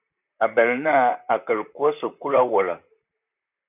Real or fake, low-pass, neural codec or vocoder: fake; 3.6 kHz; vocoder, 44.1 kHz, 128 mel bands, Pupu-Vocoder